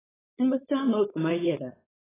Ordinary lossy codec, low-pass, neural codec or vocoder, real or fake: AAC, 16 kbps; 3.6 kHz; codec, 16 kHz, 4.8 kbps, FACodec; fake